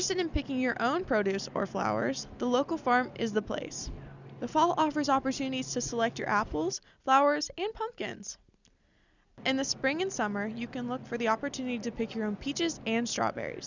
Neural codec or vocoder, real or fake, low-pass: none; real; 7.2 kHz